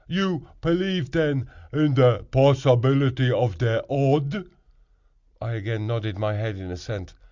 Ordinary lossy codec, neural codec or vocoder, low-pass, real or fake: Opus, 64 kbps; none; 7.2 kHz; real